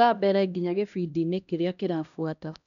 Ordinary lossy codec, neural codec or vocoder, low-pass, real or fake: none; codec, 16 kHz, 1 kbps, X-Codec, HuBERT features, trained on LibriSpeech; 7.2 kHz; fake